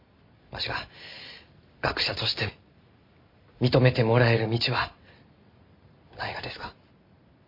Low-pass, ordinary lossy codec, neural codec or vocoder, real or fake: 5.4 kHz; MP3, 32 kbps; none; real